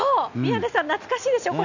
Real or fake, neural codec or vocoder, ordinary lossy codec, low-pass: real; none; none; 7.2 kHz